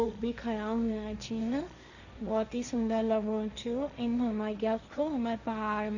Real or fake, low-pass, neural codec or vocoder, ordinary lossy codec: fake; 7.2 kHz; codec, 16 kHz, 1.1 kbps, Voila-Tokenizer; none